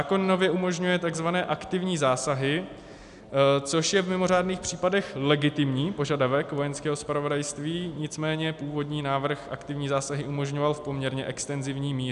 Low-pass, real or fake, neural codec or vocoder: 10.8 kHz; real; none